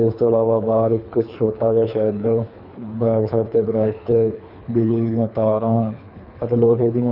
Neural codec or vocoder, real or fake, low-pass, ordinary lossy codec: codec, 24 kHz, 3 kbps, HILCodec; fake; 5.4 kHz; none